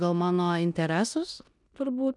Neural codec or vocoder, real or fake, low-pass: codec, 16 kHz in and 24 kHz out, 0.9 kbps, LongCat-Audio-Codec, four codebook decoder; fake; 10.8 kHz